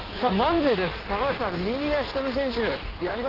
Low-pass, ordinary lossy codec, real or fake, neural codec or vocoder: 5.4 kHz; Opus, 16 kbps; fake; codec, 16 kHz in and 24 kHz out, 1.1 kbps, FireRedTTS-2 codec